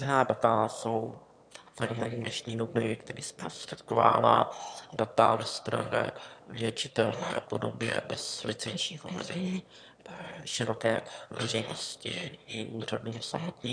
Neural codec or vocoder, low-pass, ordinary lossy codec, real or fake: autoencoder, 22.05 kHz, a latent of 192 numbers a frame, VITS, trained on one speaker; 9.9 kHz; MP3, 96 kbps; fake